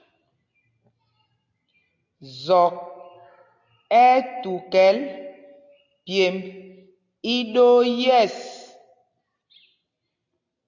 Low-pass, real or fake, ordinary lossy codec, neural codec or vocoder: 7.2 kHz; real; AAC, 48 kbps; none